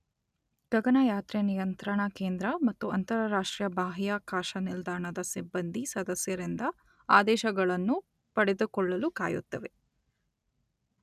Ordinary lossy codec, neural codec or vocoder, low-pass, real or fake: none; none; 14.4 kHz; real